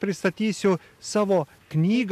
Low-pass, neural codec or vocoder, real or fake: 14.4 kHz; none; real